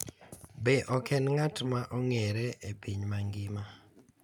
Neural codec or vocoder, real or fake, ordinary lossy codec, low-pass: none; real; none; 19.8 kHz